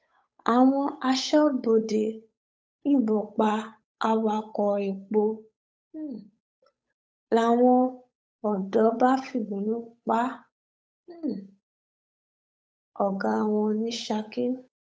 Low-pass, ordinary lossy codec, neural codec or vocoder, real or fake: none; none; codec, 16 kHz, 8 kbps, FunCodec, trained on Chinese and English, 25 frames a second; fake